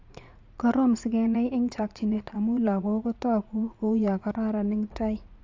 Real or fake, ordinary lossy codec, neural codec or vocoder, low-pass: fake; AAC, 48 kbps; vocoder, 44.1 kHz, 80 mel bands, Vocos; 7.2 kHz